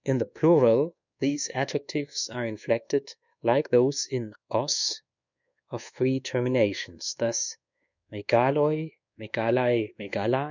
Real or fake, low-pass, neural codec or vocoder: fake; 7.2 kHz; codec, 24 kHz, 1.2 kbps, DualCodec